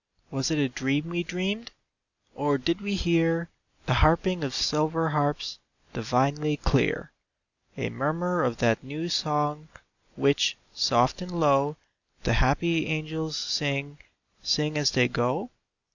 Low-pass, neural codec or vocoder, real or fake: 7.2 kHz; none; real